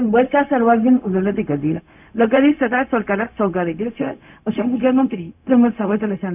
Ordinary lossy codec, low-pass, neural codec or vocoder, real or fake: none; 3.6 kHz; codec, 16 kHz, 0.4 kbps, LongCat-Audio-Codec; fake